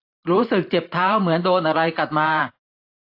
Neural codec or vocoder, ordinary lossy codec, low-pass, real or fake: vocoder, 44.1 kHz, 128 mel bands every 512 samples, BigVGAN v2; AAC, 32 kbps; 5.4 kHz; fake